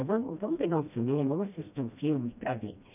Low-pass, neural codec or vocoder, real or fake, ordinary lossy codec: 3.6 kHz; codec, 16 kHz, 1 kbps, FreqCodec, smaller model; fake; none